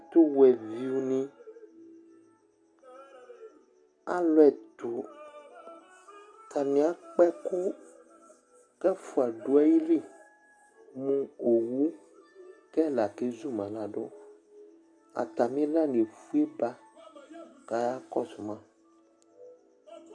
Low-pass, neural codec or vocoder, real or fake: 9.9 kHz; none; real